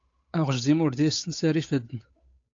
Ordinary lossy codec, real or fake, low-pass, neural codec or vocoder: AAC, 48 kbps; fake; 7.2 kHz; codec, 16 kHz, 8 kbps, FunCodec, trained on Chinese and English, 25 frames a second